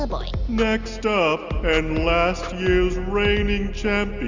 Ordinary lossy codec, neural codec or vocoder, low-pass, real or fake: Opus, 64 kbps; none; 7.2 kHz; real